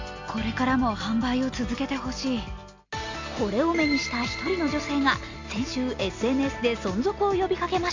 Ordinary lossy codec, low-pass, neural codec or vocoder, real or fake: AAC, 48 kbps; 7.2 kHz; none; real